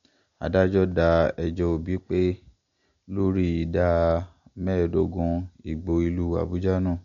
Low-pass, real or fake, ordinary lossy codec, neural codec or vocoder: 7.2 kHz; real; MP3, 48 kbps; none